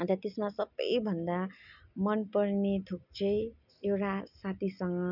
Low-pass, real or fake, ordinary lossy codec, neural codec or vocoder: 5.4 kHz; real; none; none